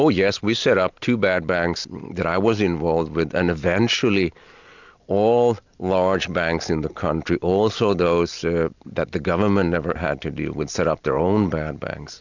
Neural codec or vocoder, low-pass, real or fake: none; 7.2 kHz; real